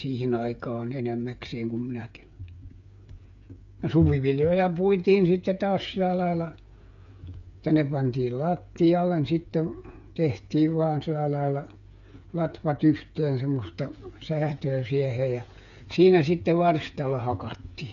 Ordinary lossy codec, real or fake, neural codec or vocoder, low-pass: none; fake; codec, 16 kHz, 8 kbps, FreqCodec, smaller model; 7.2 kHz